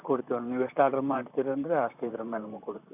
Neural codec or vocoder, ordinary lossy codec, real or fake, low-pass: vocoder, 44.1 kHz, 128 mel bands, Pupu-Vocoder; none; fake; 3.6 kHz